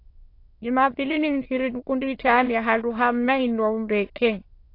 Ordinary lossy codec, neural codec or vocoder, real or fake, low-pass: AAC, 32 kbps; autoencoder, 22.05 kHz, a latent of 192 numbers a frame, VITS, trained on many speakers; fake; 5.4 kHz